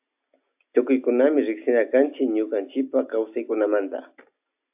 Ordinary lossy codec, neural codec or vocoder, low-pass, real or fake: AAC, 32 kbps; none; 3.6 kHz; real